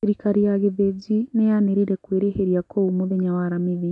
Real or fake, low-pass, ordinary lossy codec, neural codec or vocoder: real; 10.8 kHz; none; none